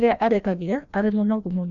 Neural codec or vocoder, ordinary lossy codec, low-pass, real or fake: codec, 16 kHz, 1 kbps, FreqCodec, larger model; none; 7.2 kHz; fake